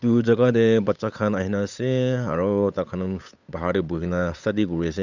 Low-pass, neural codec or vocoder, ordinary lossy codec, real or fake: 7.2 kHz; codec, 16 kHz, 8 kbps, FunCodec, trained on Chinese and English, 25 frames a second; none; fake